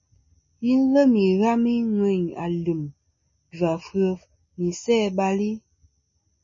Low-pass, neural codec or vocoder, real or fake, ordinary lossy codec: 7.2 kHz; none; real; MP3, 32 kbps